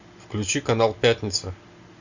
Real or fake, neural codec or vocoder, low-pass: real; none; 7.2 kHz